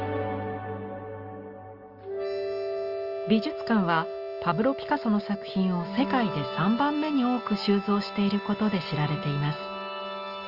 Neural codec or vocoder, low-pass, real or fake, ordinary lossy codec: none; 5.4 kHz; real; Opus, 24 kbps